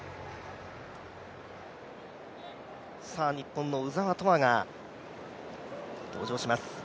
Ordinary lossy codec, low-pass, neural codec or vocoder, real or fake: none; none; none; real